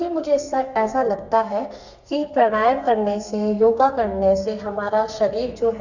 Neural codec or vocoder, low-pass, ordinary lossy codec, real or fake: codec, 32 kHz, 1.9 kbps, SNAC; 7.2 kHz; none; fake